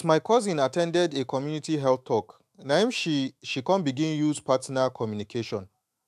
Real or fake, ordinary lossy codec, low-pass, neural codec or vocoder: fake; AAC, 96 kbps; 14.4 kHz; autoencoder, 48 kHz, 128 numbers a frame, DAC-VAE, trained on Japanese speech